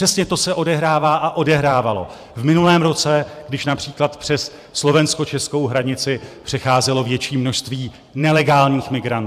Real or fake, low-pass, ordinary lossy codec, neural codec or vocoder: fake; 14.4 kHz; AAC, 96 kbps; vocoder, 44.1 kHz, 128 mel bands every 512 samples, BigVGAN v2